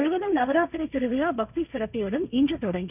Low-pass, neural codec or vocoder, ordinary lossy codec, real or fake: 3.6 kHz; codec, 16 kHz, 1.1 kbps, Voila-Tokenizer; none; fake